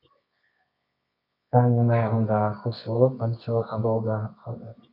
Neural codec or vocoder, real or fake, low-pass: codec, 24 kHz, 0.9 kbps, WavTokenizer, medium music audio release; fake; 5.4 kHz